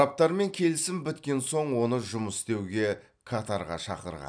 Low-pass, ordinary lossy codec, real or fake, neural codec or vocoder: 9.9 kHz; none; fake; vocoder, 44.1 kHz, 128 mel bands every 256 samples, BigVGAN v2